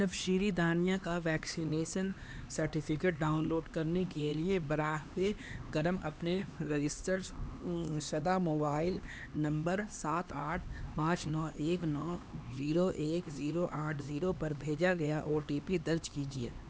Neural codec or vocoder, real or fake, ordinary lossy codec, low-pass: codec, 16 kHz, 2 kbps, X-Codec, HuBERT features, trained on LibriSpeech; fake; none; none